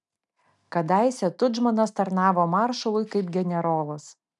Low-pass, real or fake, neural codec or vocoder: 10.8 kHz; real; none